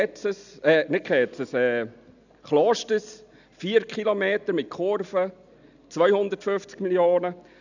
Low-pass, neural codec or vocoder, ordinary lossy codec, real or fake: 7.2 kHz; none; none; real